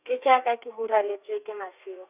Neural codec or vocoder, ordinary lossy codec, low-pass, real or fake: codec, 32 kHz, 1.9 kbps, SNAC; none; 3.6 kHz; fake